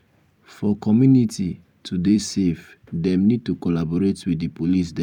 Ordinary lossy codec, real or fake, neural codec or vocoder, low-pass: none; fake; vocoder, 44.1 kHz, 128 mel bands every 256 samples, BigVGAN v2; 19.8 kHz